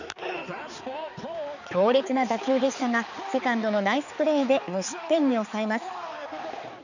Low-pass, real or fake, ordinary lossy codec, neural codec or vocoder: 7.2 kHz; fake; none; codec, 16 kHz, 4 kbps, X-Codec, HuBERT features, trained on balanced general audio